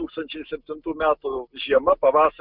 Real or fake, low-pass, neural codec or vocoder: real; 5.4 kHz; none